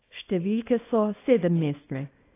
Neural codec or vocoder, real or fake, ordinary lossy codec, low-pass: codec, 24 kHz, 0.9 kbps, WavTokenizer, small release; fake; AAC, 24 kbps; 3.6 kHz